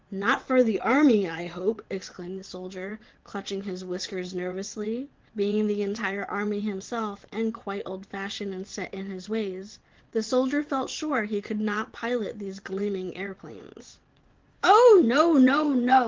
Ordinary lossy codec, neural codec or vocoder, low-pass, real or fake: Opus, 16 kbps; vocoder, 22.05 kHz, 80 mel bands, WaveNeXt; 7.2 kHz; fake